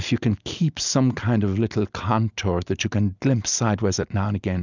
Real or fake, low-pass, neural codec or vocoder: real; 7.2 kHz; none